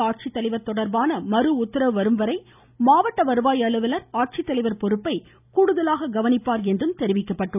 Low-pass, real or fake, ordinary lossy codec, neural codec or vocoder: 3.6 kHz; real; none; none